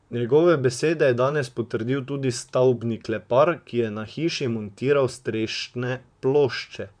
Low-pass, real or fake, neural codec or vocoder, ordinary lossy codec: 9.9 kHz; fake; vocoder, 44.1 kHz, 128 mel bands, Pupu-Vocoder; none